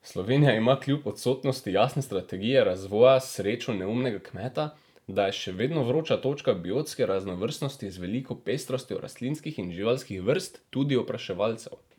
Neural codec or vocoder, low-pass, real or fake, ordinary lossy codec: vocoder, 44.1 kHz, 128 mel bands every 512 samples, BigVGAN v2; 19.8 kHz; fake; none